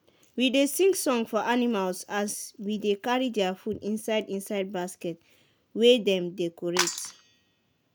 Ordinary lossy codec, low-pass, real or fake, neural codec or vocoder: none; none; real; none